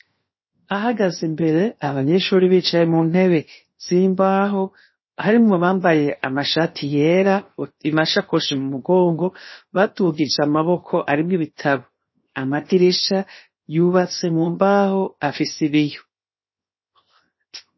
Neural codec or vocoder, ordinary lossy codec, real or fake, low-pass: codec, 16 kHz, 0.7 kbps, FocalCodec; MP3, 24 kbps; fake; 7.2 kHz